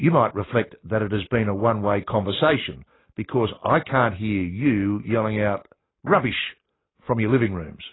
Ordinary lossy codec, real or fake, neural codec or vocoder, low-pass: AAC, 16 kbps; real; none; 7.2 kHz